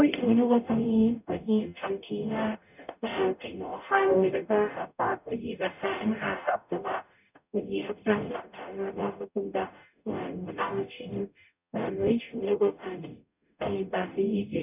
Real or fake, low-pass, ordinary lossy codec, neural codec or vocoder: fake; 3.6 kHz; none; codec, 44.1 kHz, 0.9 kbps, DAC